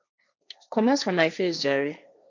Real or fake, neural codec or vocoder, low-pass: fake; codec, 16 kHz, 1.1 kbps, Voila-Tokenizer; 7.2 kHz